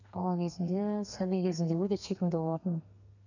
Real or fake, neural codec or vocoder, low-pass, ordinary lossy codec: fake; codec, 32 kHz, 1.9 kbps, SNAC; 7.2 kHz; none